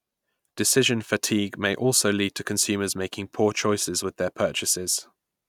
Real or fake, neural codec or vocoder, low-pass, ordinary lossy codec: real; none; 19.8 kHz; none